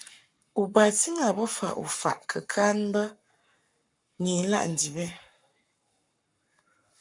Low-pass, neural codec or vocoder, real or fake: 10.8 kHz; codec, 44.1 kHz, 7.8 kbps, Pupu-Codec; fake